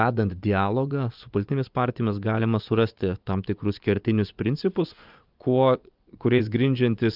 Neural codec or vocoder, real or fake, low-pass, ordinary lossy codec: vocoder, 24 kHz, 100 mel bands, Vocos; fake; 5.4 kHz; Opus, 24 kbps